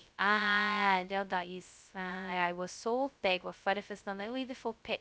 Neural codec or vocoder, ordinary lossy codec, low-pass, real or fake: codec, 16 kHz, 0.2 kbps, FocalCodec; none; none; fake